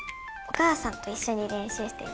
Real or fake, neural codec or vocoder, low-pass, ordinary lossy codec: real; none; none; none